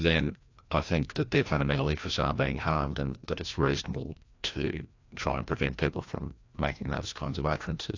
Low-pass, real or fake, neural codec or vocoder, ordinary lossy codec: 7.2 kHz; fake; codec, 16 kHz, 1 kbps, FreqCodec, larger model; AAC, 48 kbps